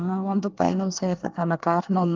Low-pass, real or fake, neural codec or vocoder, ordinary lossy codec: 7.2 kHz; fake; codec, 24 kHz, 1 kbps, SNAC; Opus, 32 kbps